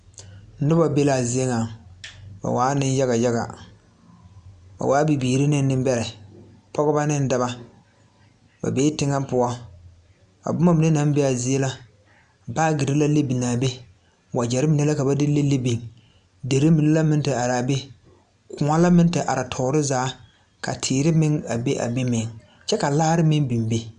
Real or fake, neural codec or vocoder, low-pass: real; none; 9.9 kHz